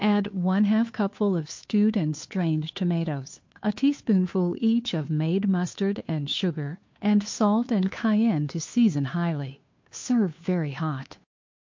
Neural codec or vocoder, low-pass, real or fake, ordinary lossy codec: codec, 16 kHz, 2 kbps, FunCodec, trained on Chinese and English, 25 frames a second; 7.2 kHz; fake; MP3, 48 kbps